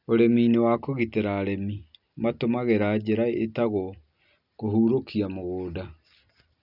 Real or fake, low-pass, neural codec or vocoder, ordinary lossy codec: real; 5.4 kHz; none; none